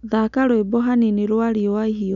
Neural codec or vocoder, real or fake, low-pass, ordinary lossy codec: none; real; 7.2 kHz; none